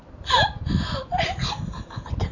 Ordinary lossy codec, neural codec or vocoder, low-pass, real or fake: none; none; 7.2 kHz; real